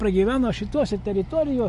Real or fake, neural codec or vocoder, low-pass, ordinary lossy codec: real; none; 10.8 kHz; MP3, 48 kbps